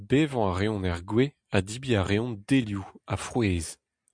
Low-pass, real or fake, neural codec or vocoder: 9.9 kHz; real; none